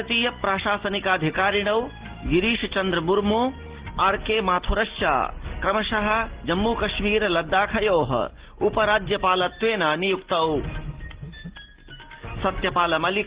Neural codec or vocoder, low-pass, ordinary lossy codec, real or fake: none; 3.6 kHz; Opus, 16 kbps; real